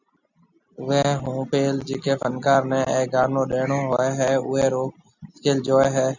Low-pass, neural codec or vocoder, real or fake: 7.2 kHz; none; real